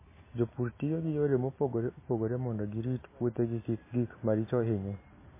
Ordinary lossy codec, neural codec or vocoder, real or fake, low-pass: MP3, 16 kbps; none; real; 3.6 kHz